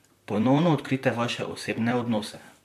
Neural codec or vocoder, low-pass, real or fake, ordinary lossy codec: vocoder, 44.1 kHz, 128 mel bands, Pupu-Vocoder; 14.4 kHz; fake; MP3, 96 kbps